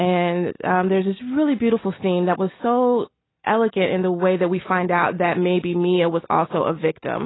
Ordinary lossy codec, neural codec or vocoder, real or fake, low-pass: AAC, 16 kbps; none; real; 7.2 kHz